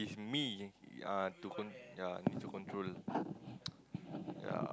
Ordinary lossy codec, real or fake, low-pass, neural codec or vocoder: none; real; none; none